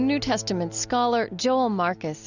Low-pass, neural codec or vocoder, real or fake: 7.2 kHz; none; real